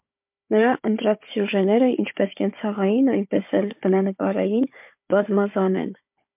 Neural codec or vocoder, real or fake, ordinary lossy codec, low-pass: codec, 16 kHz, 4 kbps, FunCodec, trained on Chinese and English, 50 frames a second; fake; MP3, 32 kbps; 3.6 kHz